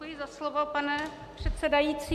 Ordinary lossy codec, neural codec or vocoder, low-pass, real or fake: MP3, 96 kbps; none; 14.4 kHz; real